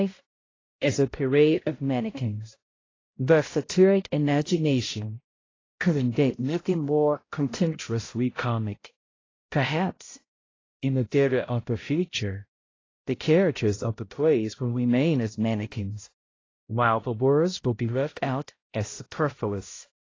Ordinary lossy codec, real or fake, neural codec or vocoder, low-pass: AAC, 32 kbps; fake; codec, 16 kHz, 0.5 kbps, X-Codec, HuBERT features, trained on balanced general audio; 7.2 kHz